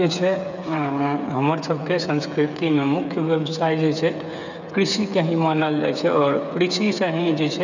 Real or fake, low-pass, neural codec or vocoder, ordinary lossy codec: fake; 7.2 kHz; codec, 16 kHz, 8 kbps, FreqCodec, smaller model; none